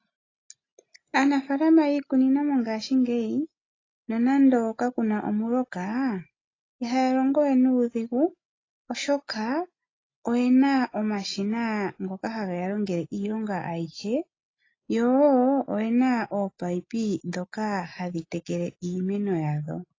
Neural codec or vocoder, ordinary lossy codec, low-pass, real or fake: none; AAC, 32 kbps; 7.2 kHz; real